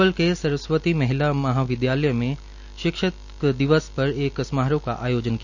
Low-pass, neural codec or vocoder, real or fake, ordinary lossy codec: 7.2 kHz; none; real; none